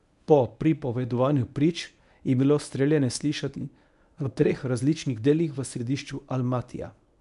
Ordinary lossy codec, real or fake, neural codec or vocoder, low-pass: none; fake; codec, 24 kHz, 0.9 kbps, WavTokenizer, medium speech release version 1; 10.8 kHz